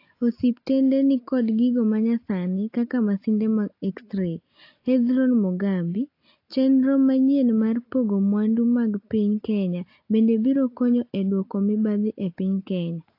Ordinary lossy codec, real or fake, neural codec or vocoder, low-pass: AAC, 32 kbps; real; none; 5.4 kHz